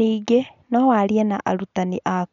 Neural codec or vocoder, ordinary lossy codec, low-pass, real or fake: none; none; 7.2 kHz; real